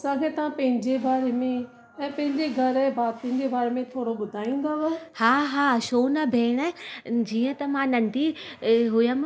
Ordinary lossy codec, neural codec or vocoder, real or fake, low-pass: none; none; real; none